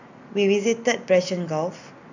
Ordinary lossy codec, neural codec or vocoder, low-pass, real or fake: none; none; 7.2 kHz; real